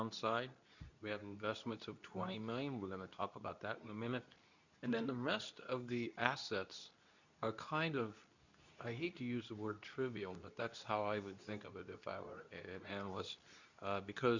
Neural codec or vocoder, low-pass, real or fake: codec, 24 kHz, 0.9 kbps, WavTokenizer, medium speech release version 2; 7.2 kHz; fake